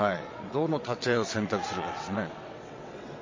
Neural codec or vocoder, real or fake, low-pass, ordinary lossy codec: vocoder, 22.05 kHz, 80 mel bands, WaveNeXt; fake; 7.2 kHz; MP3, 32 kbps